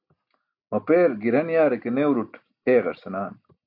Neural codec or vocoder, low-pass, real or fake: none; 5.4 kHz; real